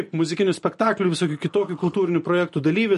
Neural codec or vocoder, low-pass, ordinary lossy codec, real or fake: none; 14.4 kHz; MP3, 48 kbps; real